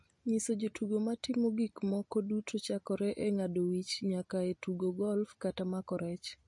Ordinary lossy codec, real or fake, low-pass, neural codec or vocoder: MP3, 48 kbps; real; 10.8 kHz; none